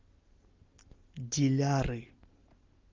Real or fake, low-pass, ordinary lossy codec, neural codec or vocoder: real; 7.2 kHz; Opus, 32 kbps; none